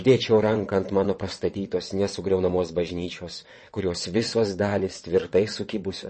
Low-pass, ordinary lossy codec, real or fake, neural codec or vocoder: 9.9 kHz; MP3, 32 kbps; fake; vocoder, 22.05 kHz, 80 mel bands, WaveNeXt